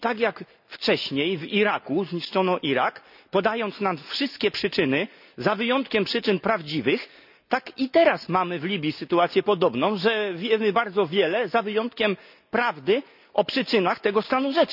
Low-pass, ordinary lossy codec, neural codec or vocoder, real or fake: 5.4 kHz; none; none; real